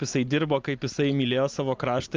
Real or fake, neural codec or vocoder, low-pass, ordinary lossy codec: real; none; 7.2 kHz; Opus, 24 kbps